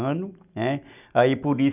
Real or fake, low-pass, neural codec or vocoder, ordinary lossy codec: real; 3.6 kHz; none; none